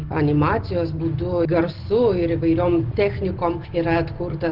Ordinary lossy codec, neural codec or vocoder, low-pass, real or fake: Opus, 16 kbps; none; 5.4 kHz; real